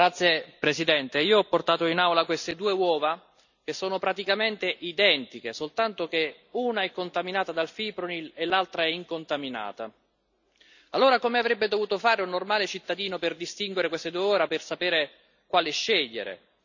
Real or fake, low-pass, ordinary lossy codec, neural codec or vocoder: real; 7.2 kHz; none; none